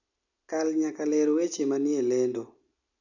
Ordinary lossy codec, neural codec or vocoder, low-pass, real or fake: none; none; 7.2 kHz; real